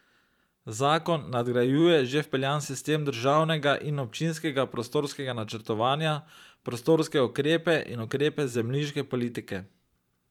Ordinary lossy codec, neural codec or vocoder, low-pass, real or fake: none; vocoder, 44.1 kHz, 128 mel bands every 512 samples, BigVGAN v2; 19.8 kHz; fake